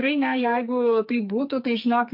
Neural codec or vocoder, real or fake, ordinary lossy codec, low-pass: codec, 44.1 kHz, 2.6 kbps, SNAC; fake; MP3, 48 kbps; 5.4 kHz